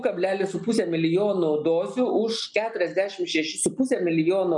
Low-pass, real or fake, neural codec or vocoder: 10.8 kHz; real; none